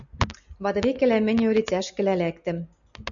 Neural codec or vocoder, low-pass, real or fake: none; 7.2 kHz; real